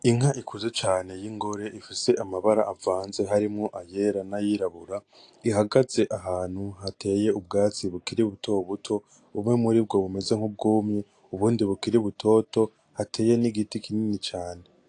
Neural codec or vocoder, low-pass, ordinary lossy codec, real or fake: none; 9.9 kHz; AAC, 48 kbps; real